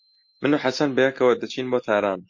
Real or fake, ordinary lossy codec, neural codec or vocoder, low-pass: real; MP3, 48 kbps; none; 7.2 kHz